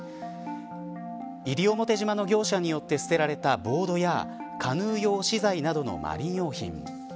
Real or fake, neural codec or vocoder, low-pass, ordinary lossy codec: real; none; none; none